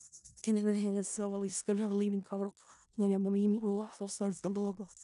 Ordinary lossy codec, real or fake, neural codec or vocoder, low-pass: none; fake; codec, 16 kHz in and 24 kHz out, 0.4 kbps, LongCat-Audio-Codec, four codebook decoder; 10.8 kHz